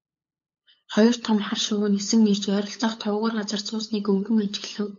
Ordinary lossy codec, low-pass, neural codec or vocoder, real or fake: MP3, 48 kbps; 7.2 kHz; codec, 16 kHz, 8 kbps, FunCodec, trained on LibriTTS, 25 frames a second; fake